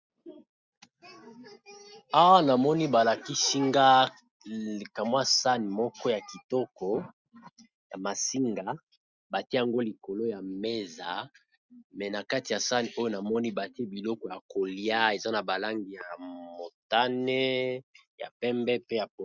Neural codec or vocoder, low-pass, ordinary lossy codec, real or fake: none; 7.2 kHz; Opus, 64 kbps; real